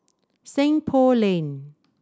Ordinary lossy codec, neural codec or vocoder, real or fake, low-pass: none; none; real; none